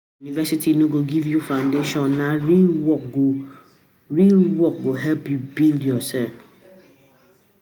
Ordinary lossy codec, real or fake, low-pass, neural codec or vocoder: none; real; none; none